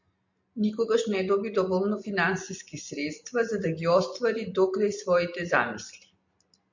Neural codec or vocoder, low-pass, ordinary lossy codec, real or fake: none; 7.2 kHz; MP3, 48 kbps; real